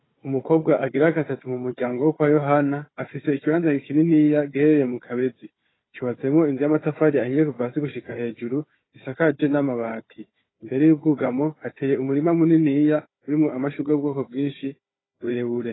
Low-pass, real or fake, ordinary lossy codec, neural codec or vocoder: 7.2 kHz; fake; AAC, 16 kbps; codec, 16 kHz, 4 kbps, FunCodec, trained on Chinese and English, 50 frames a second